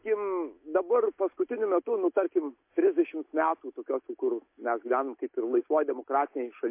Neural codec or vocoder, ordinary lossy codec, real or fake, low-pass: none; MP3, 24 kbps; real; 3.6 kHz